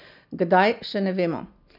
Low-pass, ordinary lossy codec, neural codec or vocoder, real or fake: 5.4 kHz; none; none; real